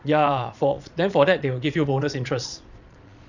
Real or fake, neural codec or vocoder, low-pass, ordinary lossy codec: fake; vocoder, 22.05 kHz, 80 mel bands, Vocos; 7.2 kHz; none